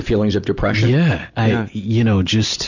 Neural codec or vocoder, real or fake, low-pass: none; real; 7.2 kHz